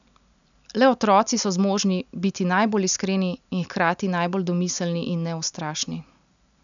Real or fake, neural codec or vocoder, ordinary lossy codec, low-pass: real; none; none; 7.2 kHz